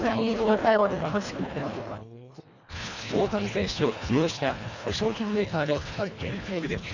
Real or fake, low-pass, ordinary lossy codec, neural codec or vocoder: fake; 7.2 kHz; none; codec, 24 kHz, 1.5 kbps, HILCodec